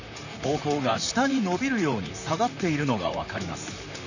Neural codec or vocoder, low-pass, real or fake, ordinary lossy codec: vocoder, 44.1 kHz, 128 mel bands, Pupu-Vocoder; 7.2 kHz; fake; none